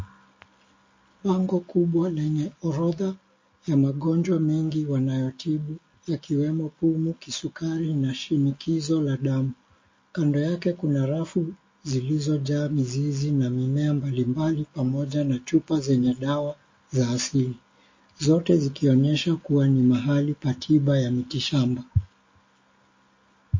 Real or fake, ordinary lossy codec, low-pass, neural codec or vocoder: real; MP3, 32 kbps; 7.2 kHz; none